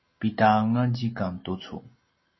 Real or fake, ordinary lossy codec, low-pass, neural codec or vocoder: real; MP3, 24 kbps; 7.2 kHz; none